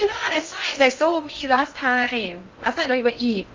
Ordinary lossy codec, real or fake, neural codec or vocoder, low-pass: Opus, 32 kbps; fake; codec, 16 kHz in and 24 kHz out, 0.6 kbps, FocalCodec, streaming, 4096 codes; 7.2 kHz